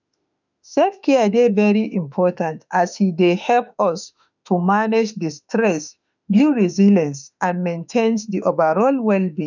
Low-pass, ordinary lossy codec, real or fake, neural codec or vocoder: 7.2 kHz; none; fake; autoencoder, 48 kHz, 32 numbers a frame, DAC-VAE, trained on Japanese speech